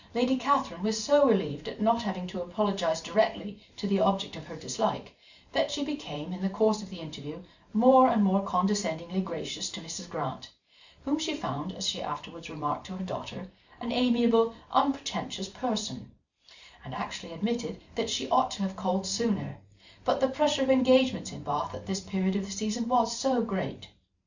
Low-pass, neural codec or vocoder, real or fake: 7.2 kHz; vocoder, 44.1 kHz, 128 mel bands every 512 samples, BigVGAN v2; fake